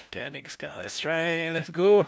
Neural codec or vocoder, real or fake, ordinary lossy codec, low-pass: codec, 16 kHz, 1 kbps, FunCodec, trained on LibriTTS, 50 frames a second; fake; none; none